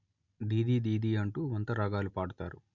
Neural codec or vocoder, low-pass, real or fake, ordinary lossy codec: none; 7.2 kHz; real; none